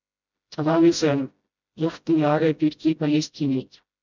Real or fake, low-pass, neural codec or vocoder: fake; 7.2 kHz; codec, 16 kHz, 0.5 kbps, FreqCodec, smaller model